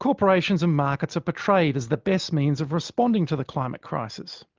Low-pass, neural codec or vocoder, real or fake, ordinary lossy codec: 7.2 kHz; none; real; Opus, 32 kbps